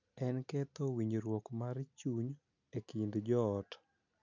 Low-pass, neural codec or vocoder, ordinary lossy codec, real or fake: 7.2 kHz; none; none; real